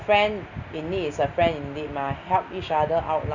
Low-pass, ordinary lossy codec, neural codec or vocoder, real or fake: 7.2 kHz; none; none; real